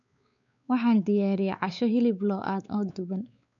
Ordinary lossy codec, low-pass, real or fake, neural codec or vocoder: none; 7.2 kHz; fake; codec, 16 kHz, 4 kbps, X-Codec, WavLM features, trained on Multilingual LibriSpeech